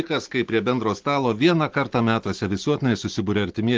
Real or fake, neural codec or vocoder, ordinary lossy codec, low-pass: real; none; Opus, 16 kbps; 7.2 kHz